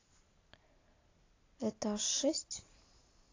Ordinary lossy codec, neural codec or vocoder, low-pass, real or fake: AAC, 32 kbps; none; 7.2 kHz; real